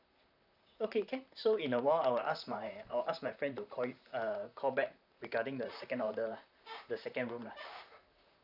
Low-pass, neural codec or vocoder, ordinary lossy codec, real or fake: 5.4 kHz; vocoder, 44.1 kHz, 128 mel bands, Pupu-Vocoder; none; fake